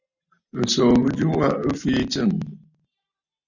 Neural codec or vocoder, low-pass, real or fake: none; 7.2 kHz; real